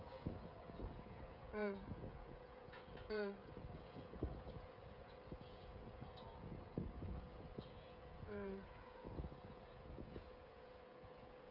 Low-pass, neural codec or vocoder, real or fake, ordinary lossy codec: 5.4 kHz; codec, 16 kHz, 16 kbps, FreqCodec, smaller model; fake; none